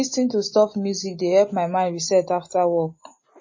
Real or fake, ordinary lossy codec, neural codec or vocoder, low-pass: real; MP3, 32 kbps; none; 7.2 kHz